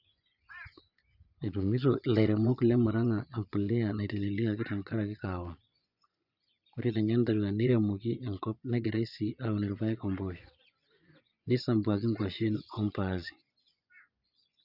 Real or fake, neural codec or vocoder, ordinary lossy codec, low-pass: fake; vocoder, 24 kHz, 100 mel bands, Vocos; none; 5.4 kHz